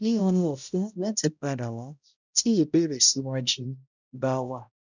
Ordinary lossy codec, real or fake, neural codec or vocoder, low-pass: none; fake; codec, 16 kHz, 0.5 kbps, X-Codec, HuBERT features, trained on balanced general audio; 7.2 kHz